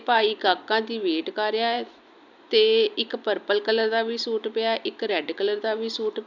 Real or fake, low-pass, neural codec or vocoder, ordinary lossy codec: real; 7.2 kHz; none; none